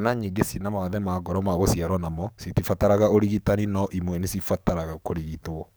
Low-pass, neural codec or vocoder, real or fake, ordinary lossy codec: none; codec, 44.1 kHz, 7.8 kbps, DAC; fake; none